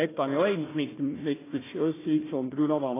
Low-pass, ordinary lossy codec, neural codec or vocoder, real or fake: 3.6 kHz; AAC, 16 kbps; codec, 16 kHz, 1 kbps, FunCodec, trained on Chinese and English, 50 frames a second; fake